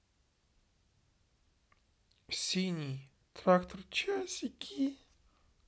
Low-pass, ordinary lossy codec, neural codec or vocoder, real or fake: none; none; none; real